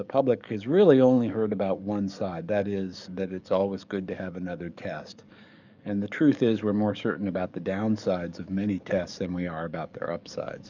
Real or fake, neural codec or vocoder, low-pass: fake; codec, 44.1 kHz, 7.8 kbps, DAC; 7.2 kHz